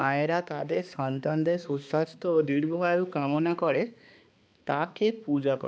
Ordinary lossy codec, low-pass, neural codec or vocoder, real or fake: none; none; codec, 16 kHz, 2 kbps, X-Codec, HuBERT features, trained on balanced general audio; fake